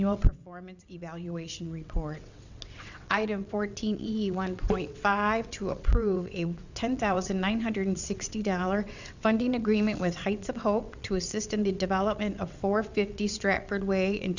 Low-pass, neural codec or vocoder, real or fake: 7.2 kHz; vocoder, 22.05 kHz, 80 mel bands, WaveNeXt; fake